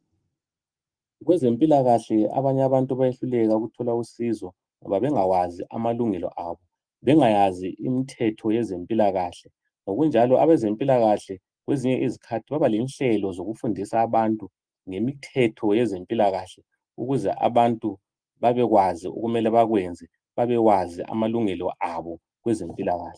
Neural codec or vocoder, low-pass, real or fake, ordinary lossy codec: none; 9.9 kHz; real; Opus, 32 kbps